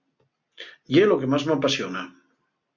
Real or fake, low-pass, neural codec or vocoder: real; 7.2 kHz; none